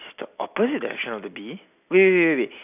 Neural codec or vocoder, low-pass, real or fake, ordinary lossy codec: none; 3.6 kHz; real; none